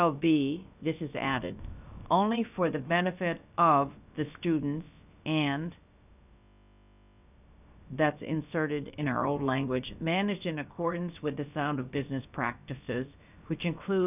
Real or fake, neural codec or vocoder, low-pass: fake; codec, 16 kHz, about 1 kbps, DyCAST, with the encoder's durations; 3.6 kHz